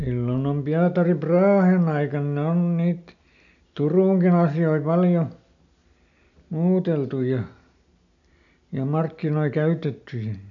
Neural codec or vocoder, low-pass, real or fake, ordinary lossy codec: none; 7.2 kHz; real; none